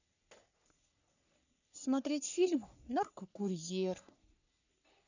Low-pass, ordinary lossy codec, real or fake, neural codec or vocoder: 7.2 kHz; none; fake; codec, 44.1 kHz, 3.4 kbps, Pupu-Codec